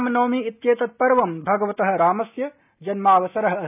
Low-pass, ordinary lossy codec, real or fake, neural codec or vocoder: 3.6 kHz; none; real; none